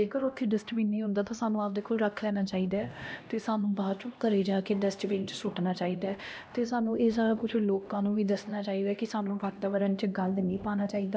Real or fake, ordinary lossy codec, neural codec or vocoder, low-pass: fake; none; codec, 16 kHz, 1 kbps, X-Codec, HuBERT features, trained on LibriSpeech; none